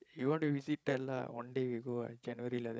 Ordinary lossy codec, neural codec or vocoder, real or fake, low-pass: none; codec, 16 kHz, 16 kbps, FreqCodec, larger model; fake; none